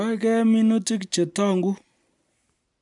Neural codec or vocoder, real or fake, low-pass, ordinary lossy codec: none; real; 10.8 kHz; none